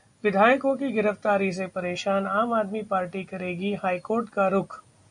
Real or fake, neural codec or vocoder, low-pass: real; none; 10.8 kHz